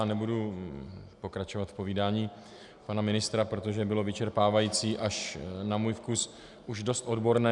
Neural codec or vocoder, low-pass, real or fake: none; 10.8 kHz; real